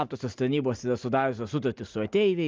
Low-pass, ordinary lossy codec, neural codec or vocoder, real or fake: 7.2 kHz; Opus, 24 kbps; none; real